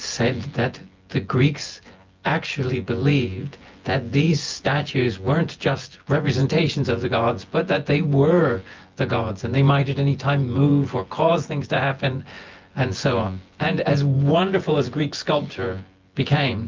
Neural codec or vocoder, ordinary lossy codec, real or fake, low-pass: vocoder, 24 kHz, 100 mel bands, Vocos; Opus, 24 kbps; fake; 7.2 kHz